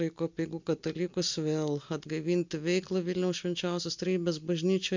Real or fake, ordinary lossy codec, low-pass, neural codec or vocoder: real; MP3, 64 kbps; 7.2 kHz; none